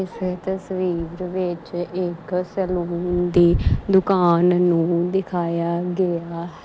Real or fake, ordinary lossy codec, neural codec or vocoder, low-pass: real; none; none; none